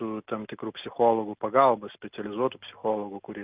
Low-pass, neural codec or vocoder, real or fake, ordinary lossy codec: 3.6 kHz; none; real; Opus, 24 kbps